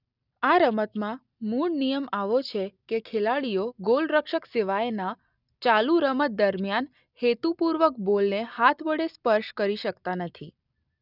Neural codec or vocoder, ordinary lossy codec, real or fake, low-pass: none; none; real; 5.4 kHz